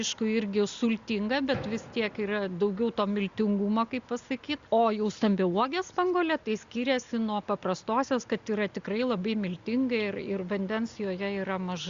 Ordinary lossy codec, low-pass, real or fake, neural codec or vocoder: Opus, 64 kbps; 7.2 kHz; real; none